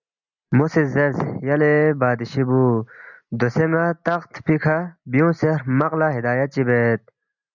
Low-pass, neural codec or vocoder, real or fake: 7.2 kHz; none; real